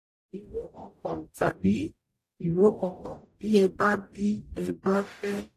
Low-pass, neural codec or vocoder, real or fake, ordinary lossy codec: 14.4 kHz; codec, 44.1 kHz, 0.9 kbps, DAC; fake; AAC, 64 kbps